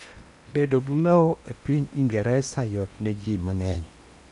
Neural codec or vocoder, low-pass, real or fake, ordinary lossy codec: codec, 16 kHz in and 24 kHz out, 0.8 kbps, FocalCodec, streaming, 65536 codes; 10.8 kHz; fake; none